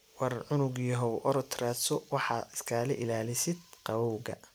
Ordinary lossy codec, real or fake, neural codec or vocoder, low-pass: none; real; none; none